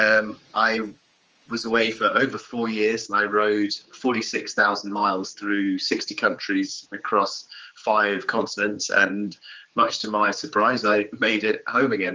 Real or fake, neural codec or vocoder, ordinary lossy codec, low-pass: fake; codec, 16 kHz, 4 kbps, X-Codec, HuBERT features, trained on general audio; Opus, 24 kbps; 7.2 kHz